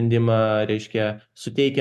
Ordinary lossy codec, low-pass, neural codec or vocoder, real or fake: MP3, 96 kbps; 14.4 kHz; none; real